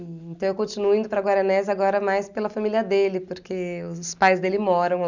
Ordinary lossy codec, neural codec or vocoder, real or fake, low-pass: none; none; real; 7.2 kHz